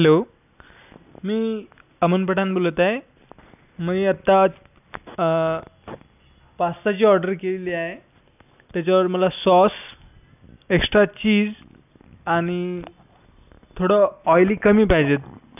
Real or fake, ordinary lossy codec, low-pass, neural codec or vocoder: real; none; 3.6 kHz; none